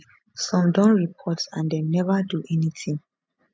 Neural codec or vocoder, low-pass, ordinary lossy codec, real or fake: none; none; none; real